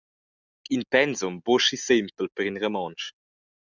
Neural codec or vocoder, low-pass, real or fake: none; 7.2 kHz; real